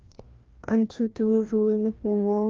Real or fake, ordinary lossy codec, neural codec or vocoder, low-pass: fake; Opus, 32 kbps; codec, 16 kHz, 1 kbps, FreqCodec, larger model; 7.2 kHz